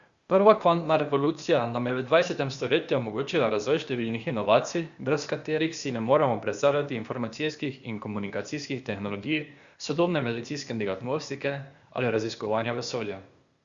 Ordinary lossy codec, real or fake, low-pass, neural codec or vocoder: Opus, 64 kbps; fake; 7.2 kHz; codec, 16 kHz, 0.8 kbps, ZipCodec